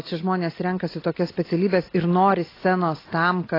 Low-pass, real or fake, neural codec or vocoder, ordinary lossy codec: 5.4 kHz; real; none; AAC, 24 kbps